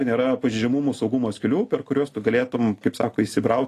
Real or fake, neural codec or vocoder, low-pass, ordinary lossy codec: real; none; 14.4 kHz; AAC, 48 kbps